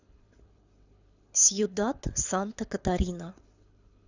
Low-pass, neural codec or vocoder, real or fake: 7.2 kHz; codec, 24 kHz, 6 kbps, HILCodec; fake